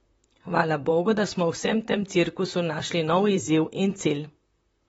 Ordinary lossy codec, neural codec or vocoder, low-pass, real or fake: AAC, 24 kbps; vocoder, 44.1 kHz, 128 mel bands, Pupu-Vocoder; 19.8 kHz; fake